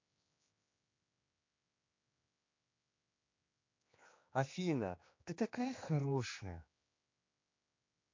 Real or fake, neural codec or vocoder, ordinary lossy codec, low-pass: fake; codec, 16 kHz, 2 kbps, X-Codec, HuBERT features, trained on general audio; MP3, 48 kbps; 7.2 kHz